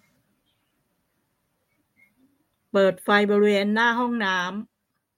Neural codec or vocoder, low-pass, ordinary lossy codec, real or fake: none; 19.8 kHz; MP3, 64 kbps; real